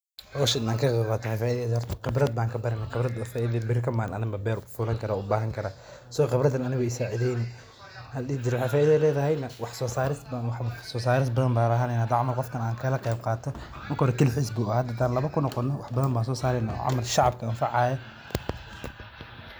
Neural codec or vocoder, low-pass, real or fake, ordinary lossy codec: none; none; real; none